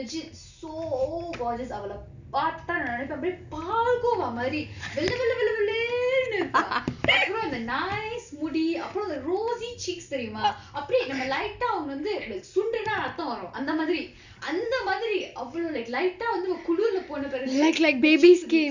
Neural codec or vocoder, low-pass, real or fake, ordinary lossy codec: none; 7.2 kHz; real; none